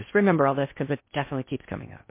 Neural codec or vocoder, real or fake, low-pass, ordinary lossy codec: codec, 16 kHz in and 24 kHz out, 0.6 kbps, FocalCodec, streaming, 2048 codes; fake; 3.6 kHz; MP3, 24 kbps